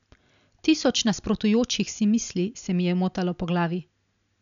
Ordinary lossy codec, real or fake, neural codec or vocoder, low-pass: none; real; none; 7.2 kHz